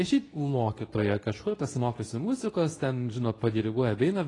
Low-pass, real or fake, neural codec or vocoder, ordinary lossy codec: 10.8 kHz; fake; codec, 24 kHz, 0.9 kbps, WavTokenizer, medium speech release version 2; AAC, 32 kbps